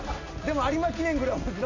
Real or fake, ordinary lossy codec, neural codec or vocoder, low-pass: real; AAC, 48 kbps; none; 7.2 kHz